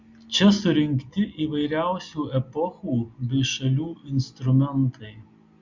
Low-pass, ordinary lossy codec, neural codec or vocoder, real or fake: 7.2 kHz; Opus, 64 kbps; none; real